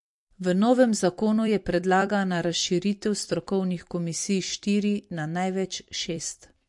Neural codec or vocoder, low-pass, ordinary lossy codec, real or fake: vocoder, 44.1 kHz, 128 mel bands every 256 samples, BigVGAN v2; 10.8 kHz; MP3, 48 kbps; fake